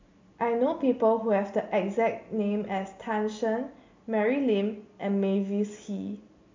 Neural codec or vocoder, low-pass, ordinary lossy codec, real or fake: none; 7.2 kHz; MP3, 48 kbps; real